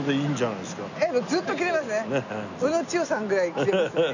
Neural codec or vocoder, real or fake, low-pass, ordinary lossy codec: none; real; 7.2 kHz; none